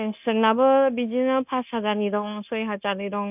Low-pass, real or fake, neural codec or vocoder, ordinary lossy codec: 3.6 kHz; fake; codec, 16 kHz, 0.9 kbps, LongCat-Audio-Codec; none